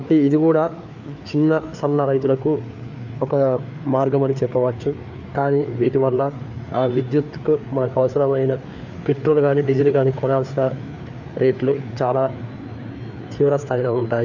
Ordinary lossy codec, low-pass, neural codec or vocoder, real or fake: none; 7.2 kHz; codec, 16 kHz, 4 kbps, FreqCodec, larger model; fake